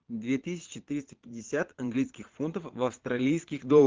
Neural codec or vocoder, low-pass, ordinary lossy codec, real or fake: vocoder, 22.05 kHz, 80 mel bands, Vocos; 7.2 kHz; Opus, 16 kbps; fake